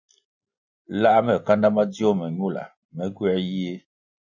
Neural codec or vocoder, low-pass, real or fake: none; 7.2 kHz; real